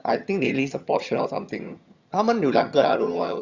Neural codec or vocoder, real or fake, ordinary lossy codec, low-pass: vocoder, 22.05 kHz, 80 mel bands, HiFi-GAN; fake; Opus, 64 kbps; 7.2 kHz